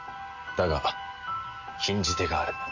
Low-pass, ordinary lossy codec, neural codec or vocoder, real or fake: 7.2 kHz; none; none; real